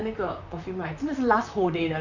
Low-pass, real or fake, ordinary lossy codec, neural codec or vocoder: 7.2 kHz; fake; none; vocoder, 44.1 kHz, 128 mel bands, Pupu-Vocoder